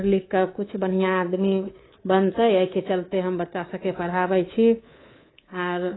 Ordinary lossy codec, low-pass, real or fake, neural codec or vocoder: AAC, 16 kbps; 7.2 kHz; fake; codec, 24 kHz, 3.1 kbps, DualCodec